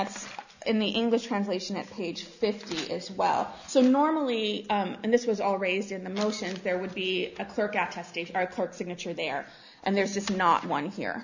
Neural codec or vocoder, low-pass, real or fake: none; 7.2 kHz; real